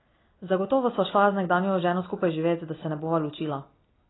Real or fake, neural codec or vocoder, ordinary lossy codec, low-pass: real; none; AAC, 16 kbps; 7.2 kHz